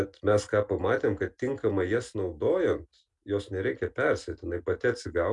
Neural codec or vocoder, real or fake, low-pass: none; real; 10.8 kHz